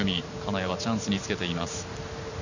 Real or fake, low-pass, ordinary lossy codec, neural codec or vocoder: fake; 7.2 kHz; none; vocoder, 44.1 kHz, 128 mel bands every 512 samples, BigVGAN v2